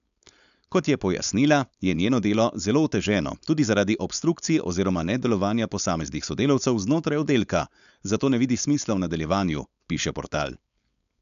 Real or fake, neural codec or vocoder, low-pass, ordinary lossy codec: fake; codec, 16 kHz, 4.8 kbps, FACodec; 7.2 kHz; none